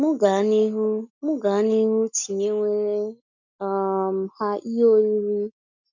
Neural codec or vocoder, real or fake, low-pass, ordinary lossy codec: none; real; 7.2 kHz; none